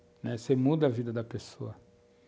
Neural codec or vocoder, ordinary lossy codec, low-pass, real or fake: none; none; none; real